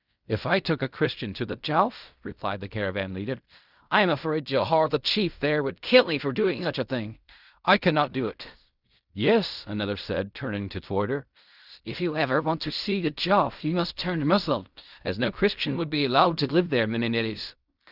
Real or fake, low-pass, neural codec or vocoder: fake; 5.4 kHz; codec, 16 kHz in and 24 kHz out, 0.4 kbps, LongCat-Audio-Codec, fine tuned four codebook decoder